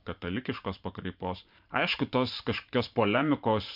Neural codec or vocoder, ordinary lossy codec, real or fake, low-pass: vocoder, 44.1 kHz, 128 mel bands every 512 samples, BigVGAN v2; MP3, 48 kbps; fake; 5.4 kHz